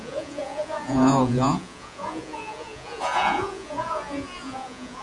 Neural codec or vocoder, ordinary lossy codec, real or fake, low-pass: vocoder, 48 kHz, 128 mel bands, Vocos; AAC, 64 kbps; fake; 10.8 kHz